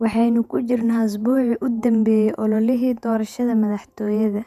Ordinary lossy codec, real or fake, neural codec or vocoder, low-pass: none; fake; vocoder, 48 kHz, 128 mel bands, Vocos; 14.4 kHz